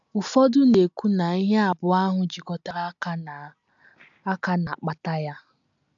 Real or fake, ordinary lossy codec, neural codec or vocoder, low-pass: real; AAC, 64 kbps; none; 7.2 kHz